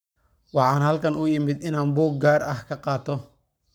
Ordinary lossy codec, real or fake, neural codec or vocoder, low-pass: none; fake; codec, 44.1 kHz, 7.8 kbps, Pupu-Codec; none